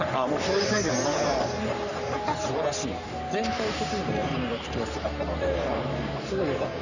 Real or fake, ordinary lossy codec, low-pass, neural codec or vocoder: fake; none; 7.2 kHz; codec, 44.1 kHz, 3.4 kbps, Pupu-Codec